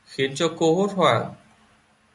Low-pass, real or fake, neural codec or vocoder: 10.8 kHz; real; none